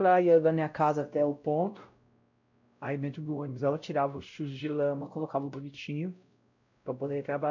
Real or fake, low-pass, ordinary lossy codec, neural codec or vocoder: fake; 7.2 kHz; none; codec, 16 kHz, 0.5 kbps, X-Codec, WavLM features, trained on Multilingual LibriSpeech